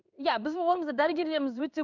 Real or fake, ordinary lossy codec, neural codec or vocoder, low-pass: fake; none; codec, 16 kHz in and 24 kHz out, 1 kbps, XY-Tokenizer; 7.2 kHz